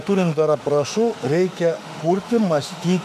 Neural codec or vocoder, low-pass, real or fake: autoencoder, 48 kHz, 32 numbers a frame, DAC-VAE, trained on Japanese speech; 14.4 kHz; fake